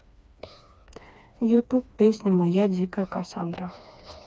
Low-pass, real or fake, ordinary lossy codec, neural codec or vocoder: none; fake; none; codec, 16 kHz, 2 kbps, FreqCodec, smaller model